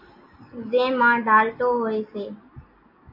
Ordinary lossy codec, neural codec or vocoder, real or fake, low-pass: MP3, 32 kbps; none; real; 5.4 kHz